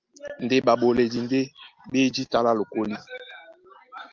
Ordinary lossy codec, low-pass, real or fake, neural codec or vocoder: Opus, 24 kbps; 7.2 kHz; real; none